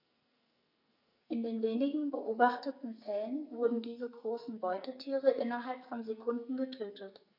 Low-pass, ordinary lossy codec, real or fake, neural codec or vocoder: 5.4 kHz; none; fake; codec, 44.1 kHz, 2.6 kbps, SNAC